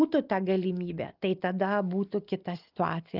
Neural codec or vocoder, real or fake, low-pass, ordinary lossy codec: none; real; 5.4 kHz; Opus, 32 kbps